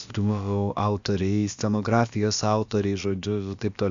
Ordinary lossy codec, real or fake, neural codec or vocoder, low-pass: Opus, 64 kbps; fake; codec, 16 kHz, about 1 kbps, DyCAST, with the encoder's durations; 7.2 kHz